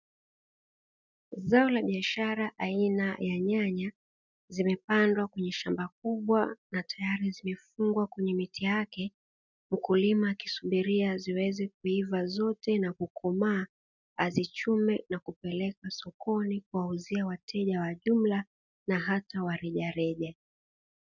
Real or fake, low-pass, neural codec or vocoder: real; 7.2 kHz; none